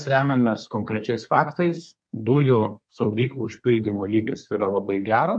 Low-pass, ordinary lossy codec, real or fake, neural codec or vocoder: 9.9 kHz; MP3, 64 kbps; fake; codec, 24 kHz, 1 kbps, SNAC